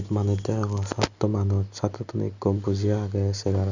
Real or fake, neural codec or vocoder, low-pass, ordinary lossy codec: real; none; 7.2 kHz; none